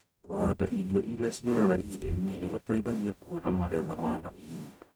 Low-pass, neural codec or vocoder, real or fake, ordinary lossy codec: none; codec, 44.1 kHz, 0.9 kbps, DAC; fake; none